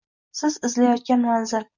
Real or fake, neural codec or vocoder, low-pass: real; none; 7.2 kHz